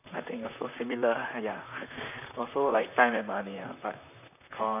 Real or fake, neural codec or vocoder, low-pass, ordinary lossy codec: fake; vocoder, 44.1 kHz, 128 mel bands, Pupu-Vocoder; 3.6 kHz; none